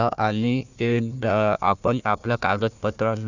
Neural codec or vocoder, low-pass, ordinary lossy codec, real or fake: codec, 16 kHz, 1 kbps, FunCodec, trained on Chinese and English, 50 frames a second; 7.2 kHz; none; fake